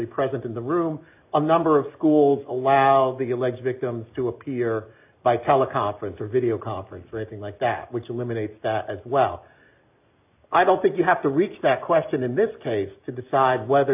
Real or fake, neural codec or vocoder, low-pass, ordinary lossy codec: real; none; 3.6 kHz; AAC, 32 kbps